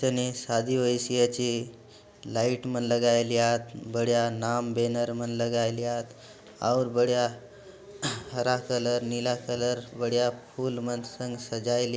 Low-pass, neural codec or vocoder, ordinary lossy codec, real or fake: none; none; none; real